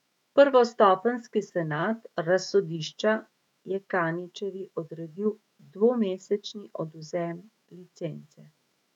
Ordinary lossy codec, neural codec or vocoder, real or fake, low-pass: none; autoencoder, 48 kHz, 128 numbers a frame, DAC-VAE, trained on Japanese speech; fake; 19.8 kHz